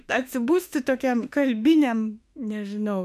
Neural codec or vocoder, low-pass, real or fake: autoencoder, 48 kHz, 32 numbers a frame, DAC-VAE, trained on Japanese speech; 14.4 kHz; fake